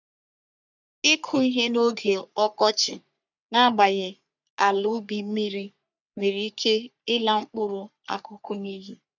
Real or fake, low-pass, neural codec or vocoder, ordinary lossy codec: fake; 7.2 kHz; codec, 44.1 kHz, 3.4 kbps, Pupu-Codec; none